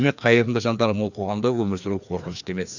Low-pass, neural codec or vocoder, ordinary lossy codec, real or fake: 7.2 kHz; codec, 16 kHz, 2 kbps, FreqCodec, larger model; none; fake